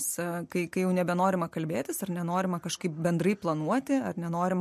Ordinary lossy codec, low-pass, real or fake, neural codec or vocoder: MP3, 64 kbps; 14.4 kHz; real; none